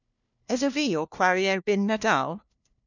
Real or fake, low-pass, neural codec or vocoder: fake; 7.2 kHz; codec, 16 kHz, 1 kbps, FunCodec, trained on LibriTTS, 50 frames a second